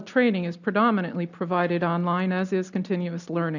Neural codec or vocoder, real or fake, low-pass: none; real; 7.2 kHz